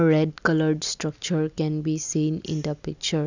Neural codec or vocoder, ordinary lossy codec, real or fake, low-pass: none; none; real; 7.2 kHz